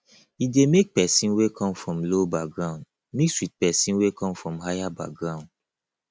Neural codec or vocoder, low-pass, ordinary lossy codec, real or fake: none; none; none; real